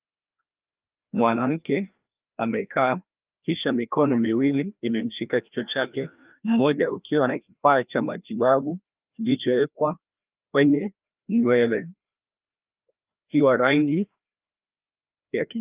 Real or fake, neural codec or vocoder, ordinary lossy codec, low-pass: fake; codec, 16 kHz, 1 kbps, FreqCodec, larger model; Opus, 24 kbps; 3.6 kHz